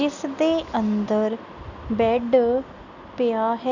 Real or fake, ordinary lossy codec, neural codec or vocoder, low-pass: real; none; none; 7.2 kHz